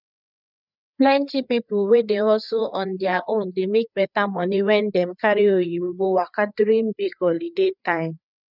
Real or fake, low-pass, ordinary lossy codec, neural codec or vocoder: fake; 5.4 kHz; none; codec, 16 kHz, 4 kbps, FreqCodec, larger model